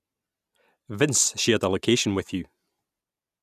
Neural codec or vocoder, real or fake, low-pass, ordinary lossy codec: none; real; 14.4 kHz; none